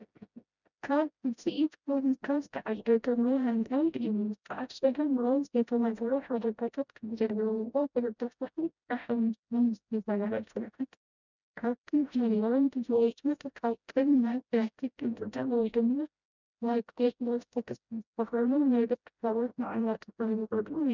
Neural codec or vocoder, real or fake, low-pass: codec, 16 kHz, 0.5 kbps, FreqCodec, smaller model; fake; 7.2 kHz